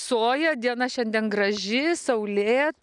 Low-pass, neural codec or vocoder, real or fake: 10.8 kHz; none; real